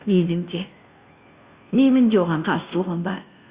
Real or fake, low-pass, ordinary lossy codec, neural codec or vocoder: fake; 3.6 kHz; Opus, 64 kbps; codec, 16 kHz, 0.5 kbps, FunCodec, trained on Chinese and English, 25 frames a second